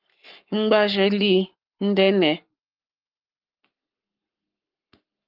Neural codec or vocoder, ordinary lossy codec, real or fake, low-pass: codec, 44.1 kHz, 7.8 kbps, Pupu-Codec; Opus, 32 kbps; fake; 5.4 kHz